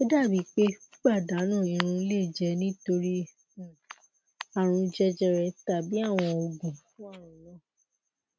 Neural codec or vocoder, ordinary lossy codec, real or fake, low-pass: none; none; real; none